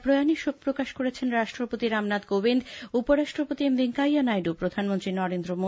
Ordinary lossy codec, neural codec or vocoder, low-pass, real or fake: none; none; none; real